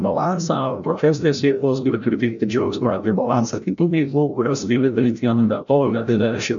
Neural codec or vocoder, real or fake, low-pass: codec, 16 kHz, 0.5 kbps, FreqCodec, larger model; fake; 7.2 kHz